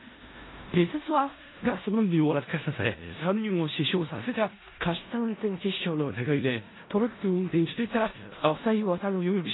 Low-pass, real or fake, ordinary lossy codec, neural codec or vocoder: 7.2 kHz; fake; AAC, 16 kbps; codec, 16 kHz in and 24 kHz out, 0.4 kbps, LongCat-Audio-Codec, four codebook decoder